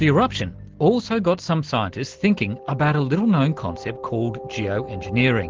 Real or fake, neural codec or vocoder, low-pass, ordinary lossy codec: real; none; 7.2 kHz; Opus, 16 kbps